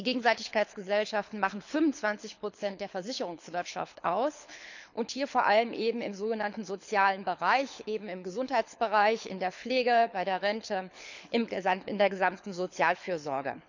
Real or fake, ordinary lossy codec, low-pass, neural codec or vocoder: fake; none; 7.2 kHz; codec, 24 kHz, 6 kbps, HILCodec